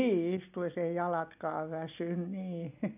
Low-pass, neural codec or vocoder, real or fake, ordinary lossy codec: 3.6 kHz; none; real; none